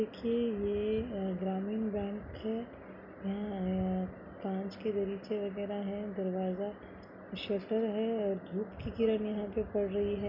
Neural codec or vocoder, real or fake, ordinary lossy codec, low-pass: none; real; none; 5.4 kHz